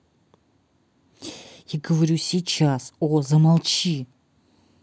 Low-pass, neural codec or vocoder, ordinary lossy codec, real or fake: none; none; none; real